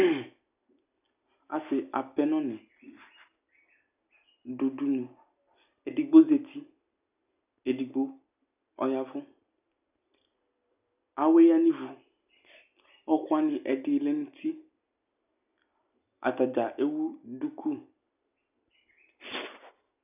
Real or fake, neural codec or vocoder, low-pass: real; none; 3.6 kHz